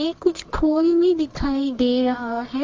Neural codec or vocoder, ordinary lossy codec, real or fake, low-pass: codec, 24 kHz, 0.9 kbps, WavTokenizer, medium music audio release; Opus, 32 kbps; fake; 7.2 kHz